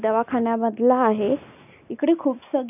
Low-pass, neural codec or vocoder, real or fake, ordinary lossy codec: 3.6 kHz; none; real; none